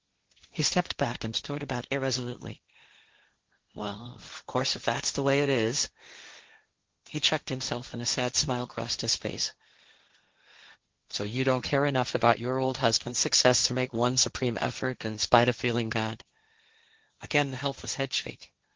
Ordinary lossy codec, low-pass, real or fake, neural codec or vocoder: Opus, 16 kbps; 7.2 kHz; fake; codec, 16 kHz, 1.1 kbps, Voila-Tokenizer